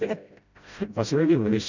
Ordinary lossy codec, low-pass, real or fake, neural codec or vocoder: none; 7.2 kHz; fake; codec, 16 kHz, 0.5 kbps, FreqCodec, smaller model